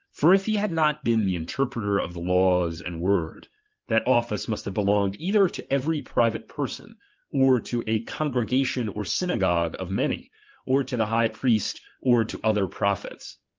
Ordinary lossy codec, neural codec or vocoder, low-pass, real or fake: Opus, 32 kbps; codec, 16 kHz in and 24 kHz out, 2.2 kbps, FireRedTTS-2 codec; 7.2 kHz; fake